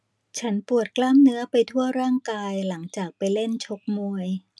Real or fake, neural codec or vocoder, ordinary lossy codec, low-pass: real; none; none; none